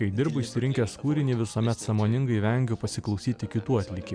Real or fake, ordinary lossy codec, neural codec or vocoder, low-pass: real; MP3, 96 kbps; none; 9.9 kHz